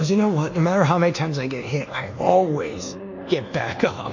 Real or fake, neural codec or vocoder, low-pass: fake; codec, 24 kHz, 1.2 kbps, DualCodec; 7.2 kHz